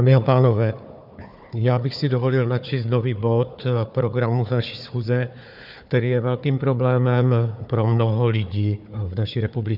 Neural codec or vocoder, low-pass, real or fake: codec, 16 kHz, 4 kbps, FunCodec, trained on Chinese and English, 50 frames a second; 5.4 kHz; fake